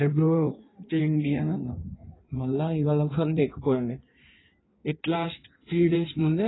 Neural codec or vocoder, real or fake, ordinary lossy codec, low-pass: codec, 16 kHz in and 24 kHz out, 1.1 kbps, FireRedTTS-2 codec; fake; AAC, 16 kbps; 7.2 kHz